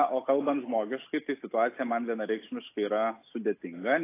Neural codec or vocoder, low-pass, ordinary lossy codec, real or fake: none; 3.6 kHz; AAC, 24 kbps; real